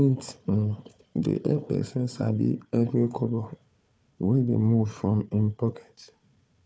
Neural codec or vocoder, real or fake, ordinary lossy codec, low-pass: codec, 16 kHz, 4 kbps, FunCodec, trained on Chinese and English, 50 frames a second; fake; none; none